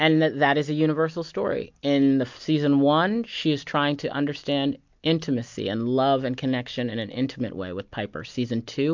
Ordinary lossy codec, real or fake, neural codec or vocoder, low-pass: MP3, 64 kbps; real; none; 7.2 kHz